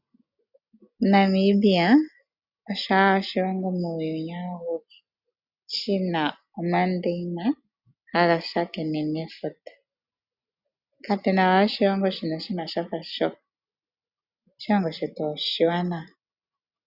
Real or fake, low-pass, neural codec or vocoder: real; 5.4 kHz; none